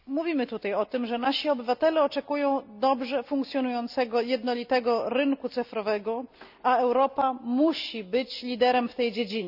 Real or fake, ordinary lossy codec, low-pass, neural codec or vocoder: real; none; 5.4 kHz; none